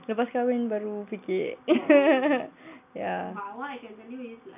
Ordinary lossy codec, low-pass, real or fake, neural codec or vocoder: none; 3.6 kHz; real; none